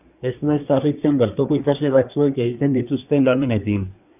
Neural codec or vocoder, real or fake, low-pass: codec, 24 kHz, 1 kbps, SNAC; fake; 3.6 kHz